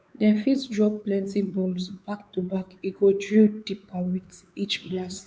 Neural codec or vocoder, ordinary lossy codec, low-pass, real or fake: codec, 16 kHz, 4 kbps, X-Codec, WavLM features, trained on Multilingual LibriSpeech; none; none; fake